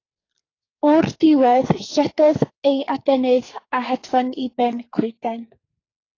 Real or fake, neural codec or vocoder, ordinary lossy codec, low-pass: fake; codec, 44.1 kHz, 2.6 kbps, SNAC; AAC, 32 kbps; 7.2 kHz